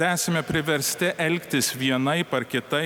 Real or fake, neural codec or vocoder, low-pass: real; none; 19.8 kHz